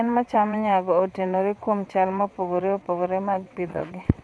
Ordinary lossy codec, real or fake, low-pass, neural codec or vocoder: none; fake; none; vocoder, 22.05 kHz, 80 mel bands, WaveNeXt